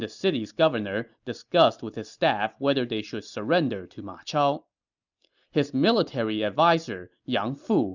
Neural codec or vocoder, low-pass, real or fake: none; 7.2 kHz; real